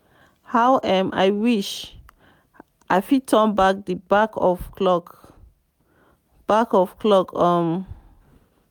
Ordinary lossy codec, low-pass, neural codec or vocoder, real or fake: none; 19.8 kHz; none; real